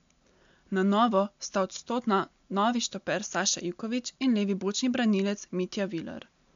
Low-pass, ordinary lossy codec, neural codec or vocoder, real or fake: 7.2 kHz; MP3, 64 kbps; none; real